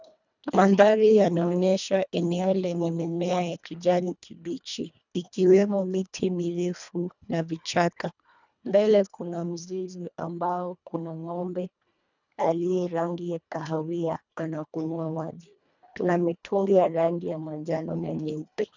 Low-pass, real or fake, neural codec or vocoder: 7.2 kHz; fake; codec, 24 kHz, 1.5 kbps, HILCodec